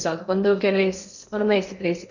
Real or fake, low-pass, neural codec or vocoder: fake; 7.2 kHz; codec, 16 kHz in and 24 kHz out, 0.8 kbps, FocalCodec, streaming, 65536 codes